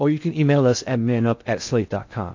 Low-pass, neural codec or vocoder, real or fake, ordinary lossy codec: 7.2 kHz; codec, 16 kHz in and 24 kHz out, 0.6 kbps, FocalCodec, streaming, 2048 codes; fake; AAC, 48 kbps